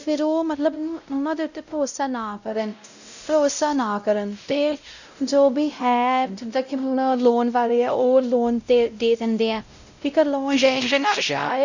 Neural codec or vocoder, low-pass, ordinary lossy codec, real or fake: codec, 16 kHz, 0.5 kbps, X-Codec, WavLM features, trained on Multilingual LibriSpeech; 7.2 kHz; none; fake